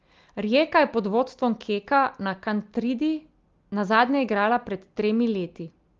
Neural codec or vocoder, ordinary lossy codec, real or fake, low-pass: none; Opus, 32 kbps; real; 7.2 kHz